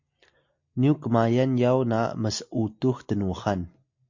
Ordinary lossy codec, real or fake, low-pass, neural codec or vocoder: MP3, 48 kbps; real; 7.2 kHz; none